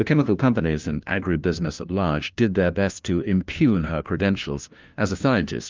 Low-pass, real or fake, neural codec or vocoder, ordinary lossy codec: 7.2 kHz; fake; codec, 16 kHz, 1 kbps, FunCodec, trained on LibriTTS, 50 frames a second; Opus, 32 kbps